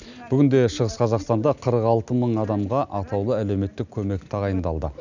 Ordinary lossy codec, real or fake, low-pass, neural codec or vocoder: none; real; 7.2 kHz; none